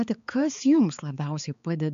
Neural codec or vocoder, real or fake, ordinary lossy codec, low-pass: codec, 16 kHz, 4 kbps, X-Codec, HuBERT features, trained on balanced general audio; fake; MP3, 64 kbps; 7.2 kHz